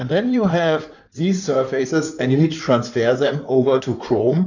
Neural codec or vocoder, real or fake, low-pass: codec, 16 kHz in and 24 kHz out, 2.2 kbps, FireRedTTS-2 codec; fake; 7.2 kHz